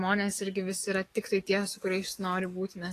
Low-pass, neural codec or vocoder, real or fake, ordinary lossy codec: 14.4 kHz; codec, 44.1 kHz, 7.8 kbps, DAC; fake; AAC, 48 kbps